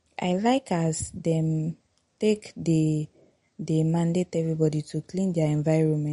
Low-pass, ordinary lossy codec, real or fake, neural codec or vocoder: 10.8 kHz; MP3, 48 kbps; real; none